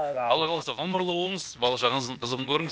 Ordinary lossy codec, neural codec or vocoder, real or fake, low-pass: none; codec, 16 kHz, 0.8 kbps, ZipCodec; fake; none